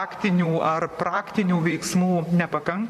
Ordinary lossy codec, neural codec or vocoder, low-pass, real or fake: AAC, 96 kbps; vocoder, 44.1 kHz, 128 mel bands, Pupu-Vocoder; 14.4 kHz; fake